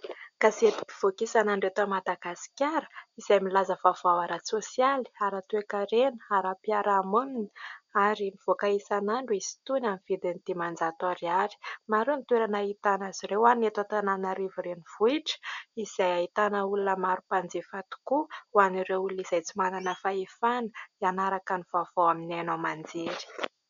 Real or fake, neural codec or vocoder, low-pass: real; none; 7.2 kHz